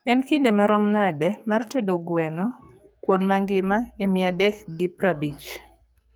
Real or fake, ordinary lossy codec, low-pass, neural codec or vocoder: fake; none; none; codec, 44.1 kHz, 2.6 kbps, SNAC